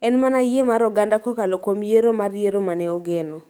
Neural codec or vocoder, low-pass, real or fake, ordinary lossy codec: codec, 44.1 kHz, 7.8 kbps, DAC; none; fake; none